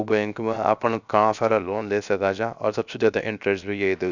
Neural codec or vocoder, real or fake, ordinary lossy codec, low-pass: codec, 16 kHz, 0.7 kbps, FocalCodec; fake; none; 7.2 kHz